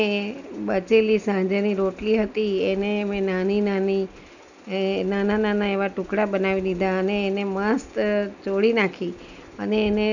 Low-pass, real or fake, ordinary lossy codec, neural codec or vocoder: 7.2 kHz; real; none; none